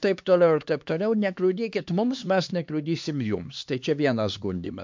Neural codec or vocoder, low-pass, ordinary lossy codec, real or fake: codec, 16 kHz, 2 kbps, X-Codec, HuBERT features, trained on LibriSpeech; 7.2 kHz; MP3, 64 kbps; fake